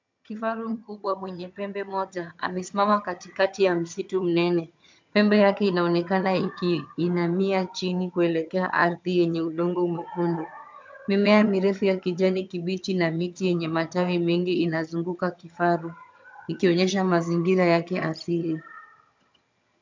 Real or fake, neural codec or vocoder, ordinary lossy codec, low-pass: fake; vocoder, 22.05 kHz, 80 mel bands, HiFi-GAN; MP3, 64 kbps; 7.2 kHz